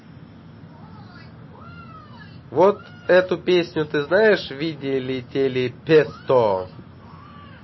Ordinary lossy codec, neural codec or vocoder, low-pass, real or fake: MP3, 24 kbps; none; 7.2 kHz; real